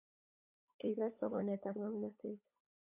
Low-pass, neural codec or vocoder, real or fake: 3.6 kHz; codec, 16 kHz, 8 kbps, FunCodec, trained on LibriTTS, 25 frames a second; fake